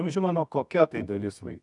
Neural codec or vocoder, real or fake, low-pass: codec, 24 kHz, 0.9 kbps, WavTokenizer, medium music audio release; fake; 10.8 kHz